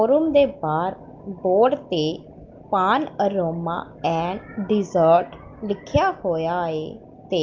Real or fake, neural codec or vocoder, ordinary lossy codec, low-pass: real; none; Opus, 32 kbps; 7.2 kHz